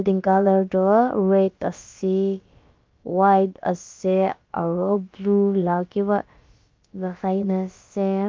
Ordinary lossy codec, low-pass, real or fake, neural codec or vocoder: Opus, 24 kbps; 7.2 kHz; fake; codec, 16 kHz, about 1 kbps, DyCAST, with the encoder's durations